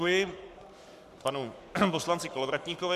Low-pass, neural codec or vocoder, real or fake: 14.4 kHz; codec, 44.1 kHz, 7.8 kbps, Pupu-Codec; fake